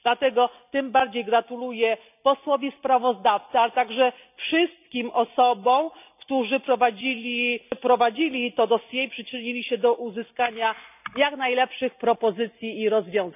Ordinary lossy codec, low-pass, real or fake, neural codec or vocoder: none; 3.6 kHz; real; none